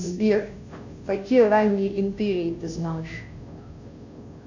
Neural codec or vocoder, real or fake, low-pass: codec, 16 kHz, 0.5 kbps, FunCodec, trained on Chinese and English, 25 frames a second; fake; 7.2 kHz